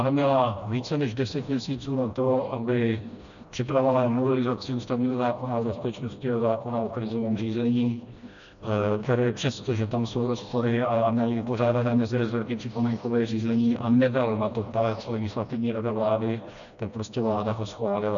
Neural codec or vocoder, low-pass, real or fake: codec, 16 kHz, 1 kbps, FreqCodec, smaller model; 7.2 kHz; fake